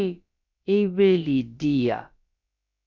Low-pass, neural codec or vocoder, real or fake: 7.2 kHz; codec, 16 kHz, about 1 kbps, DyCAST, with the encoder's durations; fake